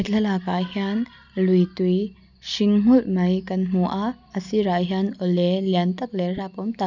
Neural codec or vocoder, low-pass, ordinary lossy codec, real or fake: none; 7.2 kHz; none; real